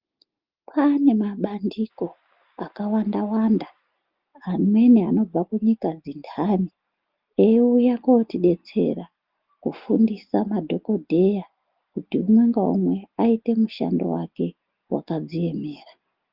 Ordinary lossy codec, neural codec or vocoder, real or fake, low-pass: Opus, 32 kbps; none; real; 5.4 kHz